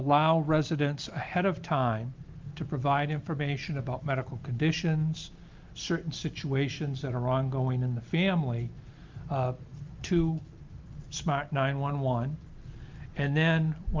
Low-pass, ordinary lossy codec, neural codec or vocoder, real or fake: 7.2 kHz; Opus, 24 kbps; none; real